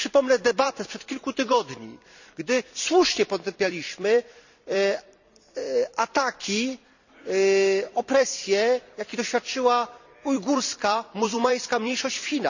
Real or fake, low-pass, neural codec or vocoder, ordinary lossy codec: fake; 7.2 kHz; vocoder, 44.1 kHz, 128 mel bands every 256 samples, BigVGAN v2; none